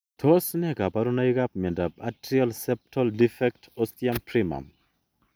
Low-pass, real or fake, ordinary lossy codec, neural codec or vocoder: none; real; none; none